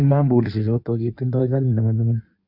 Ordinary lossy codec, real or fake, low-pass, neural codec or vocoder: Opus, 64 kbps; fake; 5.4 kHz; codec, 16 kHz in and 24 kHz out, 1.1 kbps, FireRedTTS-2 codec